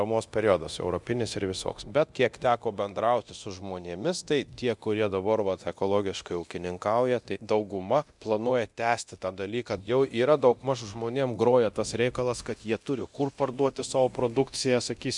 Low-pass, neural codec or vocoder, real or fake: 10.8 kHz; codec, 24 kHz, 0.9 kbps, DualCodec; fake